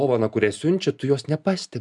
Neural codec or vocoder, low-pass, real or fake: none; 10.8 kHz; real